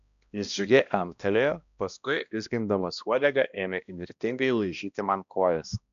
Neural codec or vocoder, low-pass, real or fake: codec, 16 kHz, 1 kbps, X-Codec, HuBERT features, trained on balanced general audio; 7.2 kHz; fake